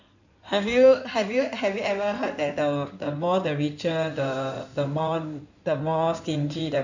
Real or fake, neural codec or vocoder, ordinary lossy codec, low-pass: fake; codec, 16 kHz in and 24 kHz out, 2.2 kbps, FireRedTTS-2 codec; none; 7.2 kHz